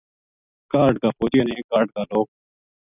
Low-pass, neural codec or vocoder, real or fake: 3.6 kHz; none; real